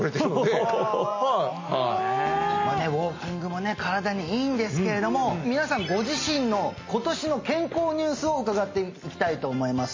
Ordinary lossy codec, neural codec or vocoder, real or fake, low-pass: MP3, 32 kbps; none; real; 7.2 kHz